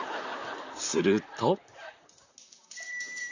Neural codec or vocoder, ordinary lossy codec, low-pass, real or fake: vocoder, 44.1 kHz, 128 mel bands, Pupu-Vocoder; none; 7.2 kHz; fake